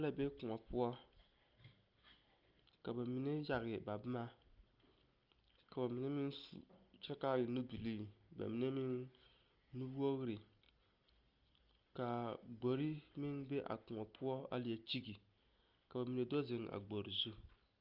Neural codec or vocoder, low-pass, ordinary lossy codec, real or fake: none; 5.4 kHz; Opus, 24 kbps; real